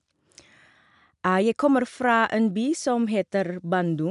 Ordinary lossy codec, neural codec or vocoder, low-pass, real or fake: none; none; 10.8 kHz; real